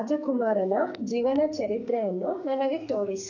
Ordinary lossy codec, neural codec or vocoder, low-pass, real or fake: none; codec, 44.1 kHz, 2.6 kbps, SNAC; 7.2 kHz; fake